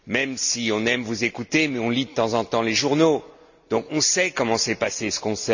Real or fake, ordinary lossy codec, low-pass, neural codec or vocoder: real; none; 7.2 kHz; none